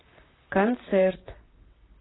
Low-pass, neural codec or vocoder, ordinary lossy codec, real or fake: 7.2 kHz; vocoder, 44.1 kHz, 128 mel bands, Pupu-Vocoder; AAC, 16 kbps; fake